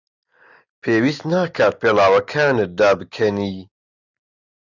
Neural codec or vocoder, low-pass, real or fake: none; 7.2 kHz; real